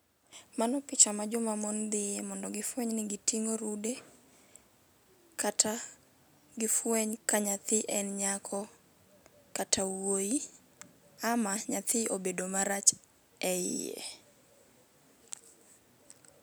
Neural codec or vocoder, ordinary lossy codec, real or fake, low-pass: none; none; real; none